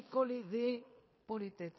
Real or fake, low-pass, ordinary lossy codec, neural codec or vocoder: fake; 7.2 kHz; MP3, 24 kbps; codec, 16 kHz in and 24 kHz out, 0.9 kbps, LongCat-Audio-Codec, fine tuned four codebook decoder